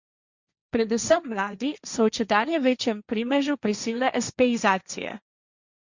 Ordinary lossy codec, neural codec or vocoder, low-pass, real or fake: Opus, 64 kbps; codec, 16 kHz, 1.1 kbps, Voila-Tokenizer; 7.2 kHz; fake